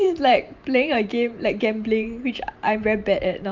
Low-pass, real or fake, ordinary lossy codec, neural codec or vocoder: 7.2 kHz; fake; Opus, 24 kbps; vocoder, 44.1 kHz, 128 mel bands every 512 samples, BigVGAN v2